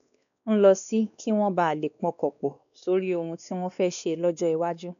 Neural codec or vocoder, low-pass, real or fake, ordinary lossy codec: codec, 16 kHz, 2 kbps, X-Codec, WavLM features, trained on Multilingual LibriSpeech; 7.2 kHz; fake; none